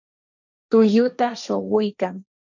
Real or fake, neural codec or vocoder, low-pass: fake; codec, 16 kHz, 1.1 kbps, Voila-Tokenizer; 7.2 kHz